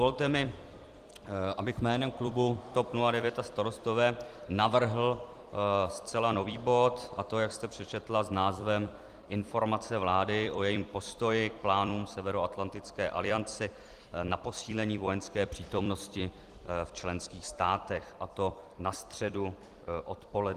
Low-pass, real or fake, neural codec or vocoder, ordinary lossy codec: 14.4 kHz; fake; vocoder, 44.1 kHz, 128 mel bands every 256 samples, BigVGAN v2; Opus, 24 kbps